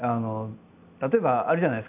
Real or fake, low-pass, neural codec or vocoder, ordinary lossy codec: real; 3.6 kHz; none; none